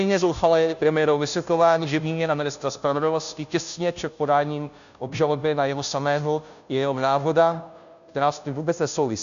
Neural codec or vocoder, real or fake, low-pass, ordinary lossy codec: codec, 16 kHz, 0.5 kbps, FunCodec, trained on Chinese and English, 25 frames a second; fake; 7.2 kHz; MP3, 96 kbps